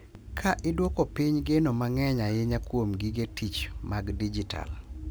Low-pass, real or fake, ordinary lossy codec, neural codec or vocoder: none; real; none; none